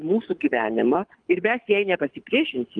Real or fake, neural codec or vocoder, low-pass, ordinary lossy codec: fake; codec, 24 kHz, 6 kbps, HILCodec; 9.9 kHz; Opus, 32 kbps